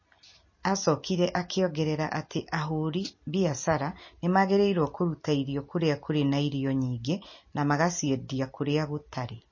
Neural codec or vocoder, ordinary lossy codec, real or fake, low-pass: none; MP3, 32 kbps; real; 7.2 kHz